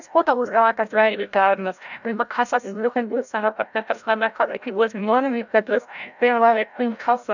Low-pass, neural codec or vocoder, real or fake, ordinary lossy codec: 7.2 kHz; codec, 16 kHz, 0.5 kbps, FreqCodec, larger model; fake; none